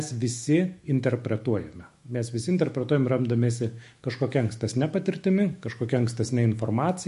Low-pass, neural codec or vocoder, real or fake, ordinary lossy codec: 14.4 kHz; autoencoder, 48 kHz, 128 numbers a frame, DAC-VAE, trained on Japanese speech; fake; MP3, 48 kbps